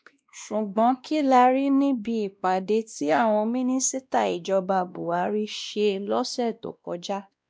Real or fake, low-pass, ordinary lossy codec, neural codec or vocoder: fake; none; none; codec, 16 kHz, 1 kbps, X-Codec, WavLM features, trained on Multilingual LibriSpeech